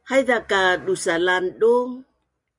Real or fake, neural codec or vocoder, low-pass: real; none; 10.8 kHz